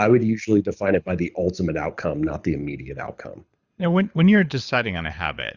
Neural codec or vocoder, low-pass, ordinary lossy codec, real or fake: codec, 24 kHz, 6 kbps, HILCodec; 7.2 kHz; Opus, 64 kbps; fake